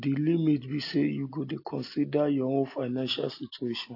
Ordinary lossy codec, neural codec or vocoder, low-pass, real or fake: AAC, 32 kbps; none; 5.4 kHz; real